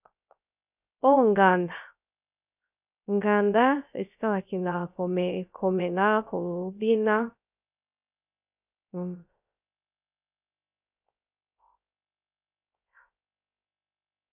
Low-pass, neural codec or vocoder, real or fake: 3.6 kHz; codec, 16 kHz, 0.3 kbps, FocalCodec; fake